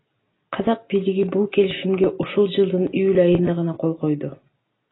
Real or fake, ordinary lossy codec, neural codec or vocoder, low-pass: real; AAC, 16 kbps; none; 7.2 kHz